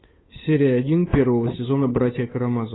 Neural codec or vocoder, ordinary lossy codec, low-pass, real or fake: codec, 16 kHz, 8 kbps, FunCodec, trained on Chinese and English, 25 frames a second; AAC, 16 kbps; 7.2 kHz; fake